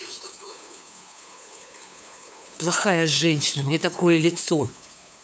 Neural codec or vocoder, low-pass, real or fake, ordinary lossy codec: codec, 16 kHz, 2 kbps, FunCodec, trained on LibriTTS, 25 frames a second; none; fake; none